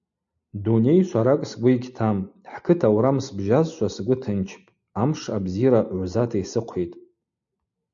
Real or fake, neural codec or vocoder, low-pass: real; none; 7.2 kHz